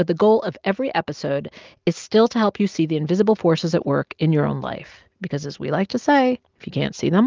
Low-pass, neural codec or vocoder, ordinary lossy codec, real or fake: 7.2 kHz; none; Opus, 32 kbps; real